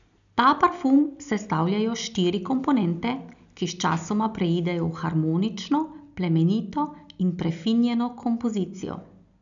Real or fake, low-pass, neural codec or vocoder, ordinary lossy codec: real; 7.2 kHz; none; none